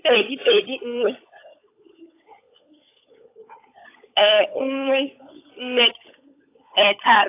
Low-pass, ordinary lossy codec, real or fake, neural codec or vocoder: 3.6 kHz; AAC, 24 kbps; fake; codec, 16 kHz, 16 kbps, FunCodec, trained on LibriTTS, 50 frames a second